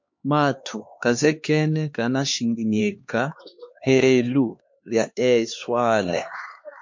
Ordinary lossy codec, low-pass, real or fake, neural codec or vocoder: MP3, 48 kbps; 7.2 kHz; fake; codec, 16 kHz, 2 kbps, X-Codec, HuBERT features, trained on LibriSpeech